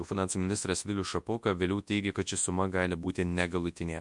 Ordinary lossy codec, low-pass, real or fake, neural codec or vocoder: MP3, 64 kbps; 10.8 kHz; fake; codec, 24 kHz, 0.9 kbps, WavTokenizer, large speech release